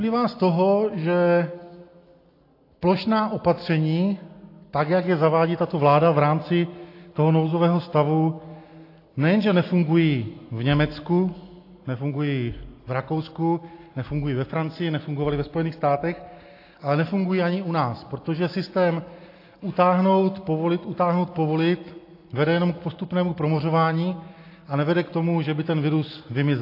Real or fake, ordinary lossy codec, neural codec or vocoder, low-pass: real; AAC, 32 kbps; none; 5.4 kHz